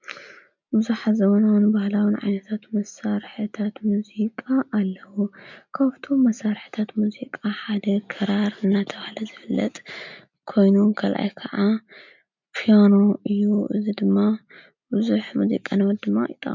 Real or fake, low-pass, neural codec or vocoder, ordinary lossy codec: real; 7.2 kHz; none; MP3, 64 kbps